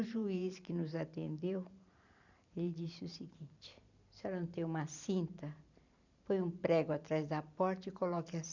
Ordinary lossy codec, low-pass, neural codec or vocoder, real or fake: none; 7.2 kHz; vocoder, 44.1 kHz, 128 mel bands every 512 samples, BigVGAN v2; fake